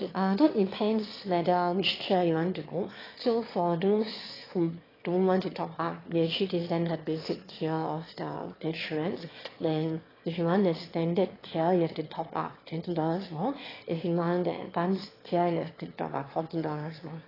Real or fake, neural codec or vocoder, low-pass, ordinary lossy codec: fake; autoencoder, 22.05 kHz, a latent of 192 numbers a frame, VITS, trained on one speaker; 5.4 kHz; AAC, 24 kbps